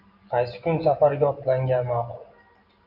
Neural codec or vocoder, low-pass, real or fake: none; 5.4 kHz; real